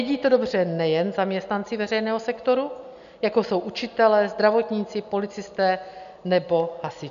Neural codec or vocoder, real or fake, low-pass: none; real; 7.2 kHz